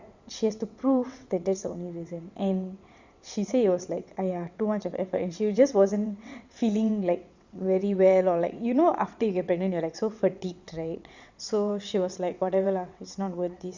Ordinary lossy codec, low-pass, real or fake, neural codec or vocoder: Opus, 64 kbps; 7.2 kHz; fake; vocoder, 22.05 kHz, 80 mel bands, WaveNeXt